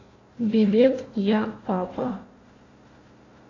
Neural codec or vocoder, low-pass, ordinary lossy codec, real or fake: codec, 16 kHz, 1 kbps, FunCodec, trained on Chinese and English, 50 frames a second; 7.2 kHz; AAC, 32 kbps; fake